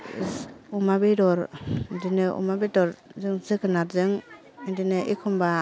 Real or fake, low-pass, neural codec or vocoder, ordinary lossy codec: real; none; none; none